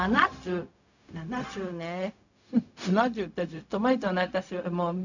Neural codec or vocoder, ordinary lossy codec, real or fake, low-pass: codec, 16 kHz, 0.4 kbps, LongCat-Audio-Codec; none; fake; 7.2 kHz